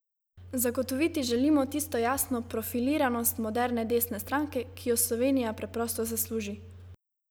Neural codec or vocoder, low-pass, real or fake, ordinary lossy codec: none; none; real; none